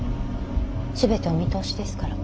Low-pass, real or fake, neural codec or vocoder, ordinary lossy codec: none; real; none; none